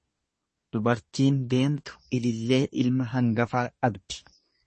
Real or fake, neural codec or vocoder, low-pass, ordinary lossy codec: fake; codec, 24 kHz, 1 kbps, SNAC; 10.8 kHz; MP3, 32 kbps